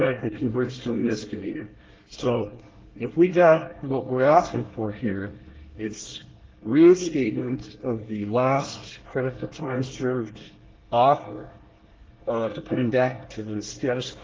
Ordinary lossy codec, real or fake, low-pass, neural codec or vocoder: Opus, 16 kbps; fake; 7.2 kHz; codec, 24 kHz, 1 kbps, SNAC